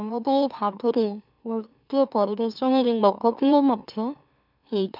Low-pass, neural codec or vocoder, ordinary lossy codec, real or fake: 5.4 kHz; autoencoder, 44.1 kHz, a latent of 192 numbers a frame, MeloTTS; none; fake